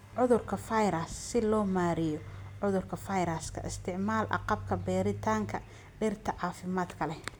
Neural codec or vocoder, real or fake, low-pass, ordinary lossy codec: none; real; none; none